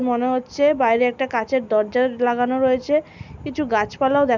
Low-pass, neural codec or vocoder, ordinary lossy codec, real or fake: 7.2 kHz; none; none; real